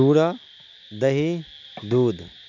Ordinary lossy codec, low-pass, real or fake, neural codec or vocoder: none; 7.2 kHz; real; none